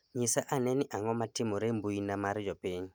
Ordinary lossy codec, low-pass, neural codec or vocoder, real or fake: none; none; none; real